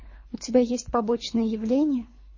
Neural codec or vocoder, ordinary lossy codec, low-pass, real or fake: codec, 24 kHz, 3 kbps, HILCodec; MP3, 32 kbps; 7.2 kHz; fake